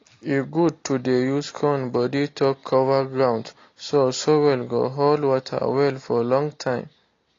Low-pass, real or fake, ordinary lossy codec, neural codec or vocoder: 7.2 kHz; real; AAC, 32 kbps; none